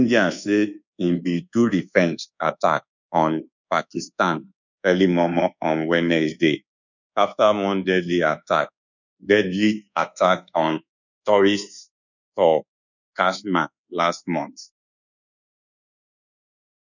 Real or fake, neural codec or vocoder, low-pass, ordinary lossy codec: fake; codec, 24 kHz, 1.2 kbps, DualCodec; 7.2 kHz; none